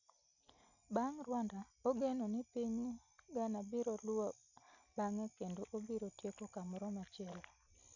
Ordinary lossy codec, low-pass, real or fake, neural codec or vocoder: none; 7.2 kHz; real; none